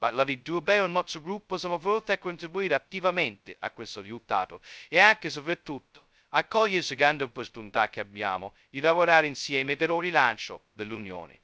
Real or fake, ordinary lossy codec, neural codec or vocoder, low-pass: fake; none; codec, 16 kHz, 0.2 kbps, FocalCodec; none